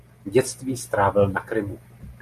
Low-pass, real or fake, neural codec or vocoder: 14.4 kHz; real; none